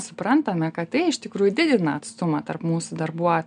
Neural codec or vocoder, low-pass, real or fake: none; 9.9 kHz; real